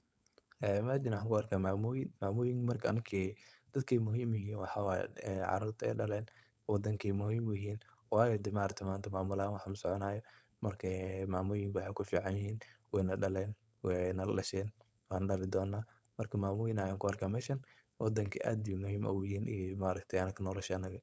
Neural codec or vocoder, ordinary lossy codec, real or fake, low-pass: codec, 16 kHz, 4.8 kbps, FACodec; none; fake; none